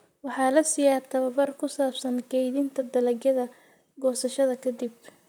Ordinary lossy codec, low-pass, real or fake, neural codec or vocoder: none; none; fake; vocoder, 44.1 kHz, 128 mel bands, Pupu-Vocoder